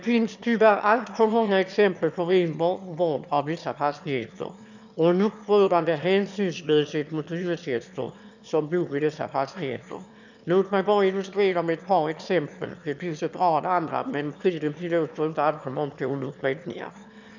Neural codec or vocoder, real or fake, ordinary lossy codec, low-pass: autoencoder, 22.05 kHz, a latent of 192 numbers a frame, VITS, trained on one speaker; fake; none; 7.2 kHz